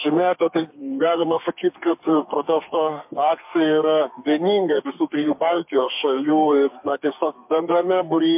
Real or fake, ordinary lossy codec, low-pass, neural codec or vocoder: fake; MP3, 32 kbps; 3.6 kHz; codec, 32 kHz, 1.9 kbps, SNAC